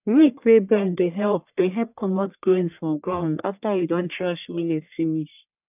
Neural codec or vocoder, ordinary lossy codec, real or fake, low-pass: codec, 44.1 kHz, 1.7 kbps, Pupu-Codec; none; fake; 3.6 kHz